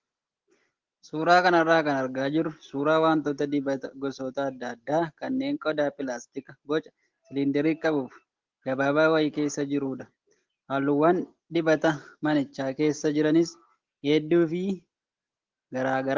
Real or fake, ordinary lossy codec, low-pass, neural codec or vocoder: real; Opus, 16 kbps; 7.2 kHz; none